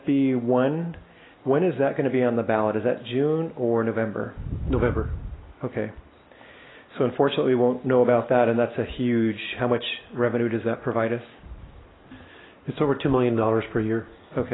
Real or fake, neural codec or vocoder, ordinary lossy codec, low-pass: real; none; AAC, 16 kbps; 7.2 kHz